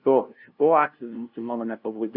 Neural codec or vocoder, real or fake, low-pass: codec, 16 kHz, 0.5 kbps, FunCodec, trained on LibriTTS, 25 frames a second; fake; 5.4 kHz